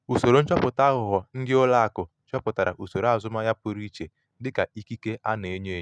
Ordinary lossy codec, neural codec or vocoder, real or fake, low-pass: none; none; real; none